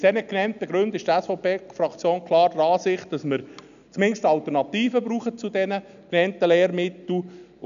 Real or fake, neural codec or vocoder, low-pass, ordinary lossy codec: real; none; 7.2 kHz; none